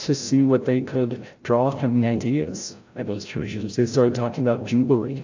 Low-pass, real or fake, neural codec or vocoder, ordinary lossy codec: 7.2 kHz; fake; codec, 16 kHz, 0.5 kbps, FreqCodec, larger model; AAC, 48 kbps